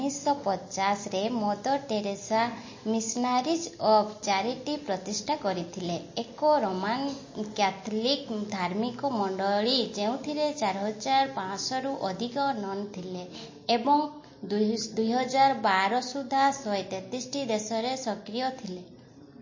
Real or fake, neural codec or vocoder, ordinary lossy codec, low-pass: real; none; MP3, 32 kbps; 7.2 kHz